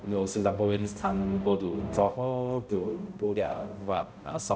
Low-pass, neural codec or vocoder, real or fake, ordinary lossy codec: none; codec, 16 kHz, 0.5 kbps, X-Codec, HuBERT features, trained on balanced general audio; fake; none